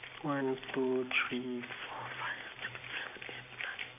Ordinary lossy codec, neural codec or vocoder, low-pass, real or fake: AAC, 24 kbps; vocoder, 44.1 kHz, 128 mel bands every 256 samples, BigVGAN v2; 3.6 kHz; fake